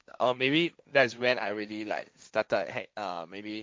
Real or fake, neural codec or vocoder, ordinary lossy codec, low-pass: fake; codec, 16 kHz, 1.1 kbps, Voila-Tokenizer; none; none